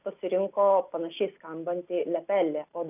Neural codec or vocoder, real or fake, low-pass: none; real; 3.6 kHz